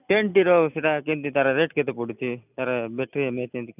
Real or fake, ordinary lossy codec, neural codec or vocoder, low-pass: real; none; none; 3.6 kHz